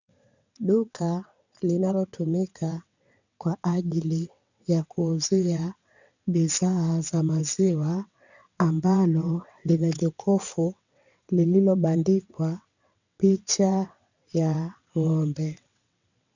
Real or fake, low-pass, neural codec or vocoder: fake; 7.2 kHz; vocoder, 22.05 kHz, 80 mel bands, WaveNeXt